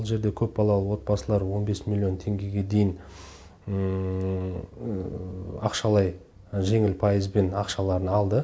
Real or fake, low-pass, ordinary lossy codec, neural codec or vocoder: real; none; none; none